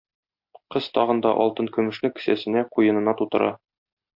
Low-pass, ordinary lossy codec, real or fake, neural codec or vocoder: 5.4 kHz; MP3, 48 kbps; real; none